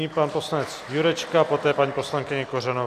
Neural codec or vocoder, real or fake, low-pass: none; real; 14.4 kHz